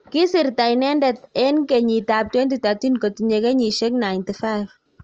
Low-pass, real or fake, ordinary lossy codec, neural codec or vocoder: 7.2 kHz; real; Opus, 24 kbps; none